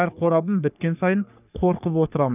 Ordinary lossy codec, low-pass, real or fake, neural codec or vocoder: none; 3.6 kHz; fake; codec, 44.1 kHz, 3.4 kbps, Pupu-Codec